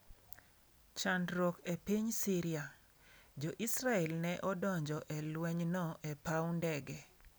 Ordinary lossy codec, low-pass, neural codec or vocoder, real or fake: none; none; none; real